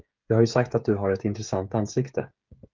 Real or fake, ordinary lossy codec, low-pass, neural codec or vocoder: fake; Opus, 32 kbps; 7.2 kHz; codec, 16 kHz, 16 kbps, FreqCodec, smaller model